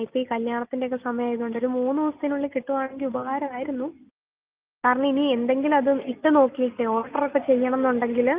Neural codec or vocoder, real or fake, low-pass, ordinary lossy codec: none; real; 3.6 kHz; Opus, 24 kbps